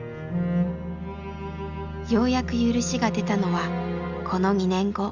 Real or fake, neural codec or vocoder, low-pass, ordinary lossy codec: real; none; 7.2 kHz; none